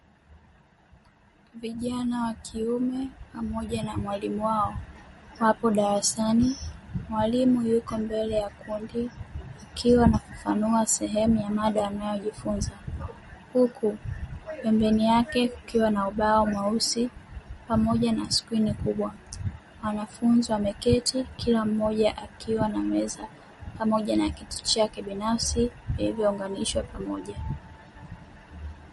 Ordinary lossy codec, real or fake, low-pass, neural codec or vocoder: MP3, 48 kbps; real; 19.8 kHz; none